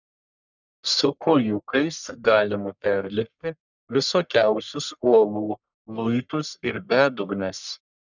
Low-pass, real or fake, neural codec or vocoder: 7.2 kHz; fake; codec, 44.1 kHz, 1.7 kbps, Pupu-Codec